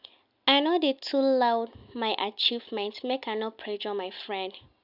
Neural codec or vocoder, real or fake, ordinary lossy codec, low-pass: none; real; none; 5.4 kHz